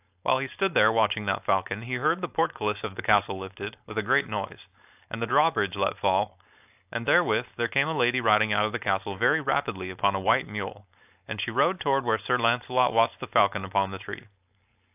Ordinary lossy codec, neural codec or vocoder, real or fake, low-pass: AAC, 32 kbps; codec, 16 kHz, 4.8 kbps, FACodec; fake; 3.6 kHz